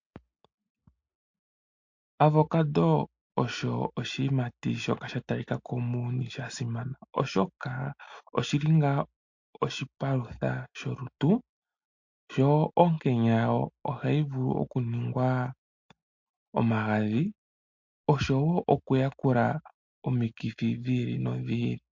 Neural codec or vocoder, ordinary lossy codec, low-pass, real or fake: none; MP3, 48 kbps; 7.2 kHz; real